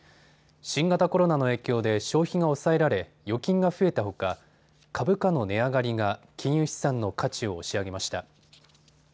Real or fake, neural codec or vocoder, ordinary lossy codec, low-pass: real; none; none; none